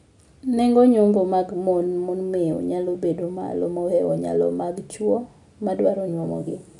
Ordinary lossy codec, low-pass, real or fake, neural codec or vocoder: none; 10.8 kHz; real; none